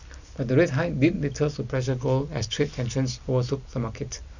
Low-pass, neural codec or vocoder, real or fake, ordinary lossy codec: 7.2 kHz; none; real; none